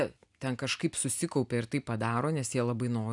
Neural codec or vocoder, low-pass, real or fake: none; 10.8 kHz; real